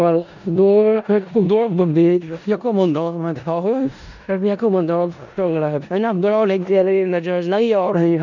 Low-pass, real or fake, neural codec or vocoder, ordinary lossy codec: 7.2 kHz; fake; codec, 16 kHz in and 24 kHz out, 0.4 kbps, LongCat-Audio-Codec, four codebook decoder; none